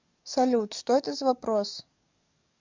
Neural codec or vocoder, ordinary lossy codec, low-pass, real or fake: codec, 44.1 kHz, 7.8 kbps, DAC; MP3, 64 kbps; 7.2 kHz; fake